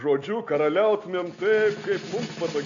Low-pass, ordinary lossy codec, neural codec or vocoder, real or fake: 7.2 kHz; AAC, 48 kbps; none; real